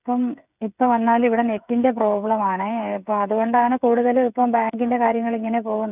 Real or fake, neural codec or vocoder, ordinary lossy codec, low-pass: fake; codec, 16 kHz, 8 kbps, FreqCodec, smaller model; none; 3.6 kHz